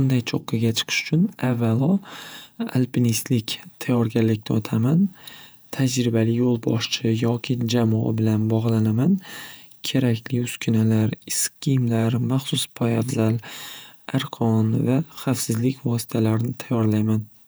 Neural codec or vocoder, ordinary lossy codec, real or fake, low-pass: vocoder, 48 kHz, 128 mel bands, Vocos; none; fake; none